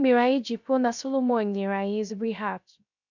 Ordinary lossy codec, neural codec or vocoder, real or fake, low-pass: none; codec, 16 kHz, 0.3 kbps, FocalCodec; fake; 7.2 kHz